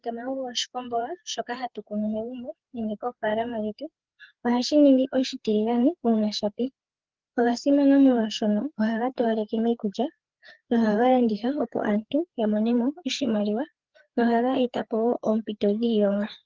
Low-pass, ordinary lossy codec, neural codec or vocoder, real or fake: 7.2 kHz; Opus, 16 kbps; codec, 16 kHz, 4 kbps, FreqCodec, larger model; fake